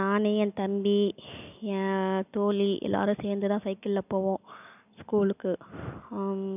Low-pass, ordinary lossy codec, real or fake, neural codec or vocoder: 3.6 kHz; none; real; none